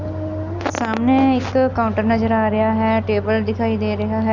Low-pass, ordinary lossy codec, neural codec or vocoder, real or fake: 7.2 kHz; none; none; real